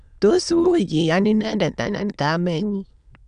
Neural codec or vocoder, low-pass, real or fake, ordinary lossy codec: autoencoder, 22.05 kHz, a latent of 192 numbers a frame, VITS, trained on many speakers; 9.9 kHz; fake; none